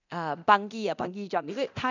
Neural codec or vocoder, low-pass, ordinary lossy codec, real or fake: codec, 24 kHz, 0.9 kbps, DualCodec; 7.2 kHz; none; fake